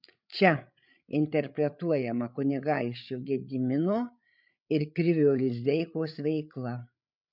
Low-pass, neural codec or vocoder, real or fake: 5.4 kHz; codec, 16 kHz, 8 kbps, FreqCodec, larger model; fake